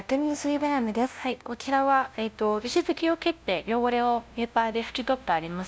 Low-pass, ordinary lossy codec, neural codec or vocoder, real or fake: none; none; codec, 16 kHz, 0.5 kbps, FunCodec, trained on LibriTTS, 25 frames a second; fake